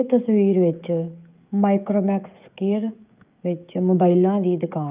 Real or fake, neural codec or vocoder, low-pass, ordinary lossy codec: fake; codec, 16 kHz, 16 kbps, FreqCodec, smaller model; 3.6 kHz; Opus, 24 kbps